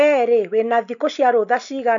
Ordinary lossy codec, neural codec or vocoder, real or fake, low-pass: none; none; real; 7.2 kHz